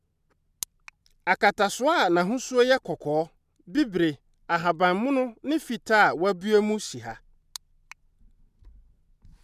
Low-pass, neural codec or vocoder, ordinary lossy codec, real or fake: 14.4 kHz; none; none; real